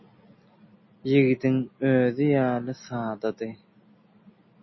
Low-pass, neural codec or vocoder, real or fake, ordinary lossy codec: 7.2 kHz; none; real; MP3, 24 kbps